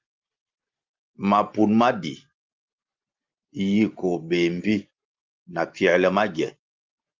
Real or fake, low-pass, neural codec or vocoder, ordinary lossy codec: real; 7.2 kHz; none; Opus, 32 kbps